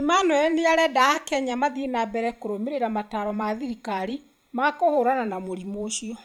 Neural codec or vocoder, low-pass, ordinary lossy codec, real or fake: vocoder, 44.1 kHz, 128 mel bands every 512 samples, BigVGAN v2; 19.8 kHz; none; fake